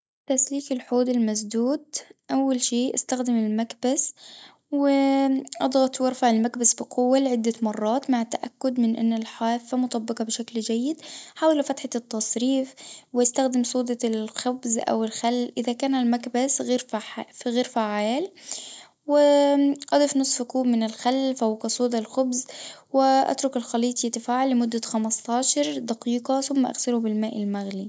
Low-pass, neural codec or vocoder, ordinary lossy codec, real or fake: none; none; none; real